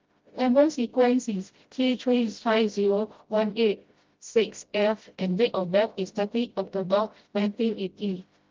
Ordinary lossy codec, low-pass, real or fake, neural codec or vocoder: Opus, 32 kbps; 7.2 kHz; fake; codec, 16 kHz, 0.5 kbps, FreqCodec, smaller model